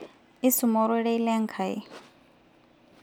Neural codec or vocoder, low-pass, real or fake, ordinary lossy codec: none; 19.8 kHz; real; none